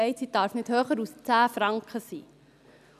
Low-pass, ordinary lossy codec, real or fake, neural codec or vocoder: 14.4 kHz; none; real; none